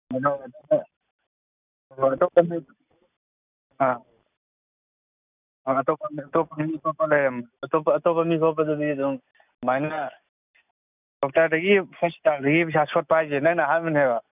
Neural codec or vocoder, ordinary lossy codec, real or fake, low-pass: none; none; real; 3.6 kHz